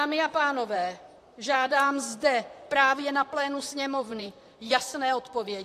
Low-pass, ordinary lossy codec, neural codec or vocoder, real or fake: 14.4 kHz; AAC, 64 kbps; vocoder, 44.1 kHz, 128 mel bands, Pupu-Vocoder; fake